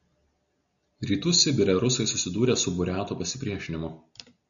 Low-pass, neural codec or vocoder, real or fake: 7.2 kHz; none; real